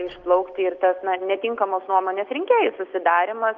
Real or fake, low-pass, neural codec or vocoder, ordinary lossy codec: real; 7.2 kHz; none; Opus, 32 kbps